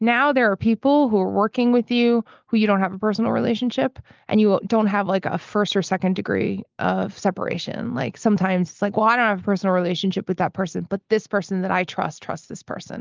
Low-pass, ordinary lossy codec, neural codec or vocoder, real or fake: 7.2 kHz; Opus, 32 kbps; none; real